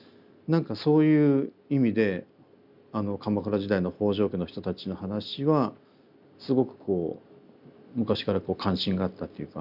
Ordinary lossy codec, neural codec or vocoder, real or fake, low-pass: none; none; real; 5.4 kHz